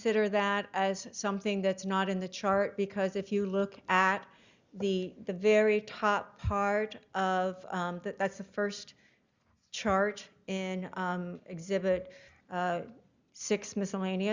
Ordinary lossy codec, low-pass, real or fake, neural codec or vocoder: Opus, 64 kbps; 7.2 kHz; real; none